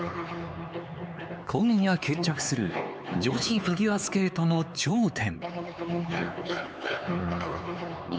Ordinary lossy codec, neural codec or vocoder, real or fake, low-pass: none; codec, 16 kHz, 4 kbps, X-Codec, HuBERT features, trained on LibriSpeech; fake; none